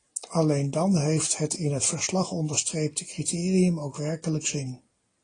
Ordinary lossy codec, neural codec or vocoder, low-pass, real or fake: AAC, 32 kbps; none; 9.9 kHz; real